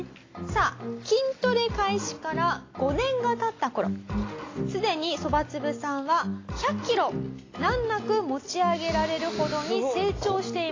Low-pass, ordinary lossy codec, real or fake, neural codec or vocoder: 7.2 kHz; AAC, 32 kbps; real; none